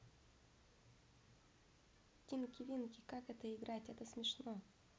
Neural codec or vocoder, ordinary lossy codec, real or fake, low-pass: none; none; real; none